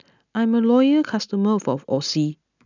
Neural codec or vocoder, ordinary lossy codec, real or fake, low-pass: none; none; real; 7.2 kHz